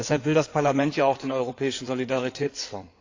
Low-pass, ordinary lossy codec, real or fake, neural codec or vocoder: 7.2 kHz; AAC, 48 kbps; fake; codec, 16 kHz in and 24 kHz out, 2.2 kbps, FireRedTTS-2 codec